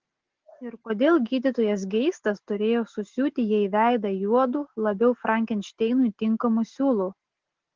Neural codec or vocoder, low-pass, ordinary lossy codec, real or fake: none; 7.2 kHz; Opus, 16 kbps; real